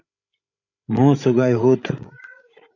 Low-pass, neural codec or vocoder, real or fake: 7.2 kHz; codec, 16 kHz, 16 kbps, FreqCodec, larger model; fake